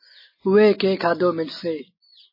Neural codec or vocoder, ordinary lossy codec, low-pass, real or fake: none; MP3, 24 kbps; 5.4 kHz; real